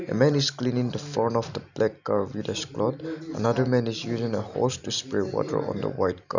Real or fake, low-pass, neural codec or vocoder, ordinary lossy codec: real; 7.2 kHz; none; none